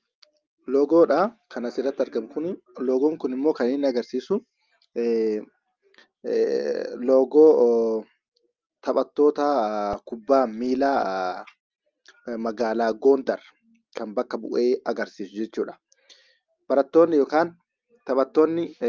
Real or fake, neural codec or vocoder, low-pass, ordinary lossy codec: real; none; 7.2 kHz; Opus, 24 kbps